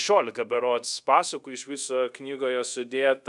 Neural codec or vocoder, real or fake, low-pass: codec, 24 kHz, 0.5 kbps, DualCodec; fake; 10.8 kHz